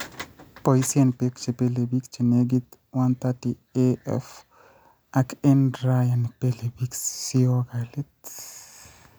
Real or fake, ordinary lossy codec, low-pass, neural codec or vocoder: real; none; none; none